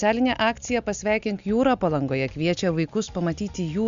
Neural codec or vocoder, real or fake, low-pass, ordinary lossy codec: none; real; 7.2 kHz; Opus, 64 kbps